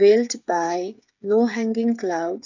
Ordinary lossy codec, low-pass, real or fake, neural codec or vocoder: none; 7.2 kHz; fake; codec, 16 kHz, 8 kbps, FreqCodec, smaller model